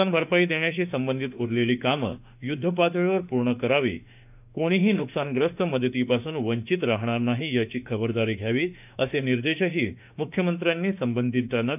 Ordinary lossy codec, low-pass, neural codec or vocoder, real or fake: none; 3.6 kHz; autoencoder, 48 kHz, 32 numbers a frame, DAC-VAE, trained on Japanese speech; fake